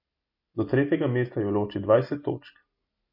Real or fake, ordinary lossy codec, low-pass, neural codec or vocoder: real; MP3, 32 kbps; 5.4 kHz; none